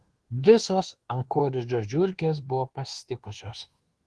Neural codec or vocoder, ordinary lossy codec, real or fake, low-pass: codec, 24 kHz, 1.2 kbps, DualCodec; Opus, 16 kbps; fake; 10.8 kHz